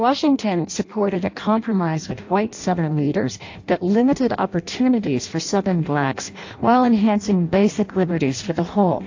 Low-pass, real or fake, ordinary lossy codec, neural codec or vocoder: 7.2 kHz; fake; AAC, 48 kbps; codec, 16 kHz in and 24 kHz out, 0.6 kbps, FireRedTTS-2 codec